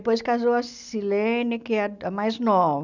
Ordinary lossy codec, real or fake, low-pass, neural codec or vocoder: none; real; 7.2 kHz; none